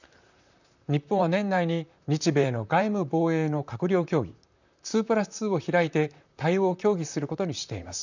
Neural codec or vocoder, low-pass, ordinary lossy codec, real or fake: vocoder, 44.1 kHz, 128 mel bands, Pupu-Vocoder; 7.2 kHz; none; fake